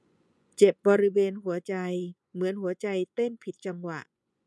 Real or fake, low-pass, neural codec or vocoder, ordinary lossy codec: fake; none; vocoder, 24 kHz, 100 mel bands, Vocos; none